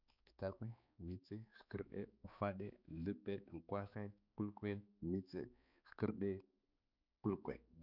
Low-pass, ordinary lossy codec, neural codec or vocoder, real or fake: 5.4 kHz; none; codec, 16 kHz, 4 kbps, X-Codec, HuBERT features, trained on balanced general audio; fake